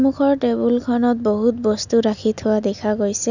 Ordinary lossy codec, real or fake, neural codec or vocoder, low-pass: none; real; none; 7.2 kHz